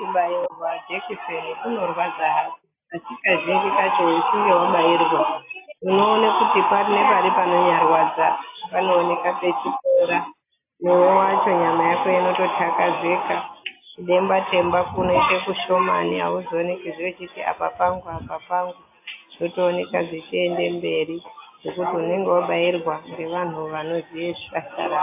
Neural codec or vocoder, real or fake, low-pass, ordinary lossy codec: none; real; 3.6 kHz; AAC, 24 kbps